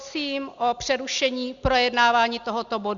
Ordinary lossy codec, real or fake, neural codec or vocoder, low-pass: Opus, 64 kbps; real; none; 7.2 kHz